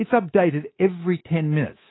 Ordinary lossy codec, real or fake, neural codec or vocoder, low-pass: AAC, 16 kbps; fake; codec, 24 kHz, 3.1 kbps, DualCodec; 7.2 kHz